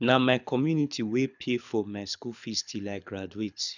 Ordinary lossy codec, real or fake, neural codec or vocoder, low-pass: none; fake; codec, 24 kHz, 6 kbps, HILCodec; 7.2 kHz